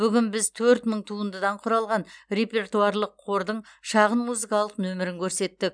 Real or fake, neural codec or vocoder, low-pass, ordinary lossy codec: real; none; 9.9 kHz; none